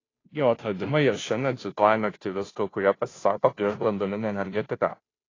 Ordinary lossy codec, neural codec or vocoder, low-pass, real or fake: AAC, 32 kbps; codec, 16 kHz, 0.5 kbps, FunCodec, trained on Chinese and English, 25 frames a second; 7.2 kHz; fake